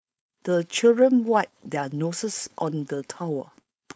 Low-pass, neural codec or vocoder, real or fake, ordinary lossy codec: none; codec, 16 kHz, 4.8 kbps, FACodec; fake; none